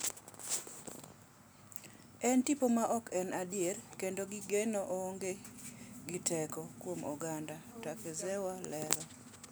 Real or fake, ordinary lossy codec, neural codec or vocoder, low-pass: real; none; none; none